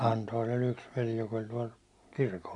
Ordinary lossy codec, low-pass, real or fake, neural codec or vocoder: none; 10.8 kHz; real; none